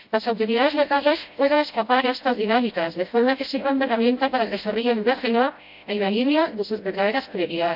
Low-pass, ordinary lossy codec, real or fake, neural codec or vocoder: 5.4 kHz; AAC, 48 kbps; fake; codec, 16 kHz, 0.5 kbps, FreqCodec, smaller model